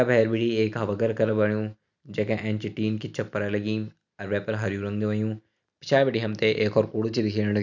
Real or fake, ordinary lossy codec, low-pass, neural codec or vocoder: real; none; 7.2 kHz; none